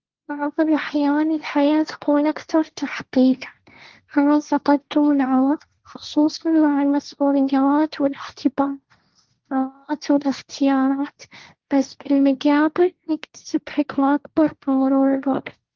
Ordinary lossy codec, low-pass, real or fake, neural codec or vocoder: Opus, 16 kbps; 7.2 kHz; fake; codec, 16 kHz, 1.1 kbps, Voila-Tokenizer